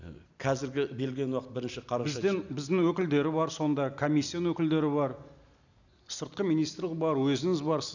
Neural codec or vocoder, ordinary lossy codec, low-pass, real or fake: none; none; 7.2 kHz; real